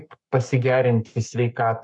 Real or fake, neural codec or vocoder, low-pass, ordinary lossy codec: fake; codec, 44.1 kHz, 7.8 kbps, Pupu-Codec; 10.8 kHz; MP3, 96 kbps